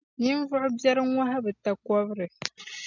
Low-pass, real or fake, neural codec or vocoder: 7.2 kHz; real; none